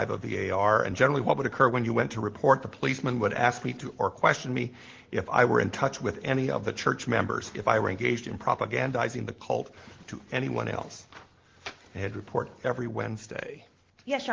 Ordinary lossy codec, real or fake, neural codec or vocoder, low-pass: Opus, 16 kbps; real; none; 7.2 kHz